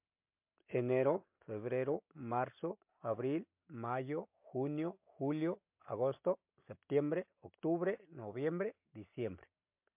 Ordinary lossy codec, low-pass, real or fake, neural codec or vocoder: MP3, 32 kbps; 3.6 kHz; real; none